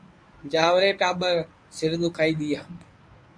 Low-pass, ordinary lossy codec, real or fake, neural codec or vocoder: 9.9 kHz; MP3, 64 kbps; fake; codec, 24 kHz, 0.9 kbps, WavTokenizer, medium speech release version 1